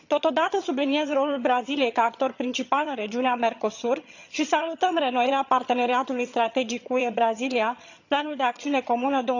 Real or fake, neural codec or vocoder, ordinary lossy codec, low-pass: fake; vocoder, 22.05 kHz, 80 mel bands, HiFi-GAN; none; 7.2 kHz